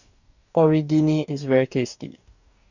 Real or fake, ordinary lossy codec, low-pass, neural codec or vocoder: fake; none; 7.2 kHz; codec, 44.1 kHz, 2.6 kbps, DAC